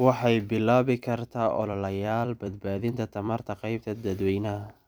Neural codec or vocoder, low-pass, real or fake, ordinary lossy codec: vocoder, 44.1 kHz, 128 mel bands every 256 samples, BigVGAN v2; none; fake; none